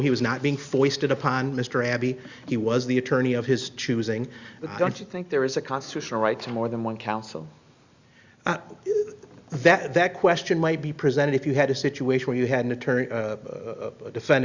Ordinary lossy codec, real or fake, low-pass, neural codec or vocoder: Opus, 64 kbps; real; 7.2 kHz; none